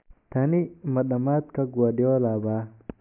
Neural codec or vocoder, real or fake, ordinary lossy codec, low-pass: none; real; MP3, 32 kbps; 3.6 kHz